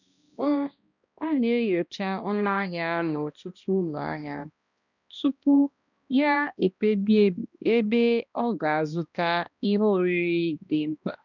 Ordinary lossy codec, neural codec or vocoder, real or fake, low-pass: none; codec, 16 kHz, 1 kbps, X-Codec, HuBERT features, trained on balanced general audio; fake; 7.2 kHz